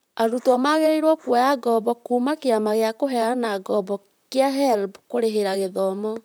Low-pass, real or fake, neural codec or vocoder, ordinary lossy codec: none; fake; vocoder, 44.1 kHz, 128 mel bands, Pupu-Vocoder; none